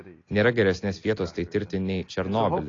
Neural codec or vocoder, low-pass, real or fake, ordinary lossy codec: none; 7.2 kHz; real; AAC, 32 kbps